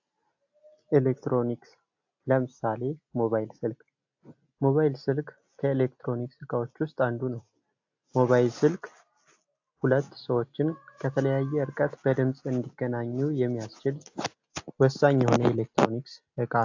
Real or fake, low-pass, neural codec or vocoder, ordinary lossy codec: real; 7.2 kHz; none; AAC, 48 kbps